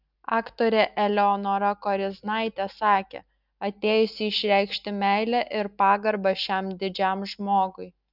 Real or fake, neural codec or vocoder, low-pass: real; none; 5.4 kHz